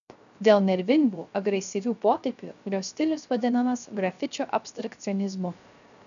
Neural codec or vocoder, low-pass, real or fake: codec, 16 kHz, 0.3 kbps, FocalCodec; 7.2 kHz; fake